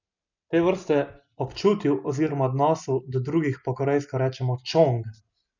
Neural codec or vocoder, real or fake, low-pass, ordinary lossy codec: none; real; 7.2 kHz; none